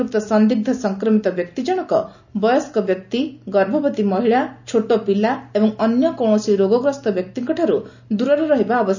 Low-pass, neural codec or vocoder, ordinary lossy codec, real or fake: 7.2 kHz; none; none; real